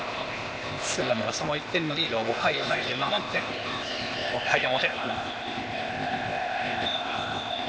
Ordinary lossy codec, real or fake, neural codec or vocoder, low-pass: none; fake; codec, 16 kHz, 0.8 kbps, ZipCodec; none